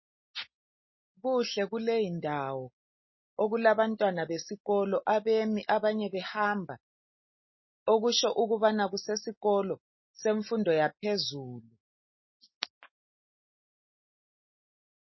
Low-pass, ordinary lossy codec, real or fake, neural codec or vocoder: 7.2 kHz; MP3, 24 kbps; real; none